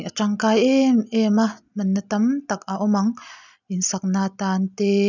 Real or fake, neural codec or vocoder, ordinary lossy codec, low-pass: real; none; none; 7.2 kHz